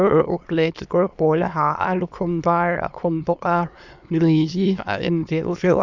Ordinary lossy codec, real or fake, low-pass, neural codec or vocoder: none; fake; 7.2 kHz; autoencoder, 22.05 kHz, a latent of 192 numbers a frame, VITS, trained on many speakers